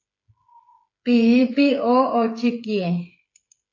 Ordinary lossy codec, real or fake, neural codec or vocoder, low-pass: AAC, 48 kbps; fake; codec, 16 kHz, 8 kbps, FreqCodec, smaller model; 7.2 kHz